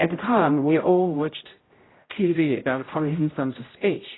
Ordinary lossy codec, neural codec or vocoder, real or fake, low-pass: AAC, 16 kbps; codec, 16 kHz, 0.5 kbps, X-Codec, HuBERT features, trained on general audio; fake; 7.2 kHz